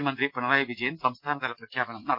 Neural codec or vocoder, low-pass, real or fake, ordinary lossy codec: none; 5.4 kHz; real; Opus, 24 kbps